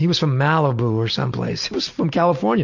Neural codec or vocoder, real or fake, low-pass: none; real; 7.2 kHz